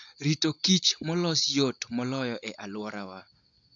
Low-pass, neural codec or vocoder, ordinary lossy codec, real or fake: 7.2 kHz; none; AAC, 64 kbps; real